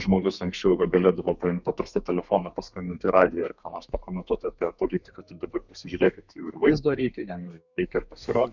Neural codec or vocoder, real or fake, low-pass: codec, 32 kHz, 1.9 kbps, SNAC; fake; 7.2 kHz